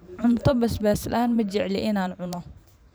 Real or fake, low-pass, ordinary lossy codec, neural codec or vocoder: fake; none; none; vocoder, 44.1 kHz, 128 mel bands every 512 samples, BigVGAN v2